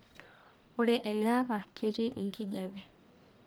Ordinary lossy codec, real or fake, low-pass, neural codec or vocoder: none; fake; none; codec, 44.1 kHz, 1.7 kbps, Pupu-Codec